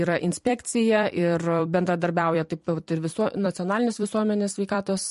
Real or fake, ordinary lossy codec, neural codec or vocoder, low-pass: fake; MP3, 48 kbps; vocoder, 44.1 kHz, 128 mel bands every 256 samples, BigVGAN v2; 14.4 kHz